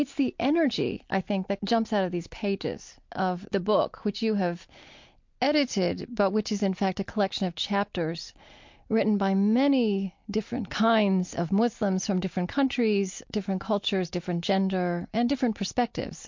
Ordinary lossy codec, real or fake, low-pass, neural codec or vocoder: MP3, 48 kbps; real; 7.2 kHz; none